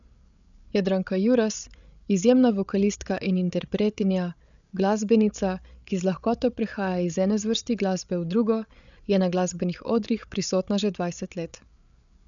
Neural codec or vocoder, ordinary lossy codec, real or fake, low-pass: codec, 16 kHz, 8 kbps, FreqCodec, larger model; none; fake; 7.2 kHz